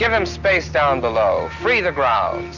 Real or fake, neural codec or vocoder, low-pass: real; none; 7.2 kHz